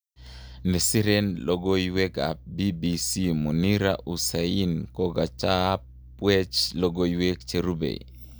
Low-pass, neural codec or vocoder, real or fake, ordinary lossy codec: none; none; real; none